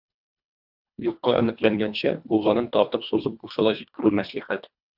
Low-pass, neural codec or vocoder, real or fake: 5.4 kHz; codec, 24 kHz, 1.5 kbps, HILCodec; fake